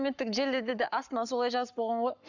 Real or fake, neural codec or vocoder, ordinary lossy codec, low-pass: fake; codec, 44.1 kHz, 7.8 kbps, Pupu-Codec; none; 7.2 kHz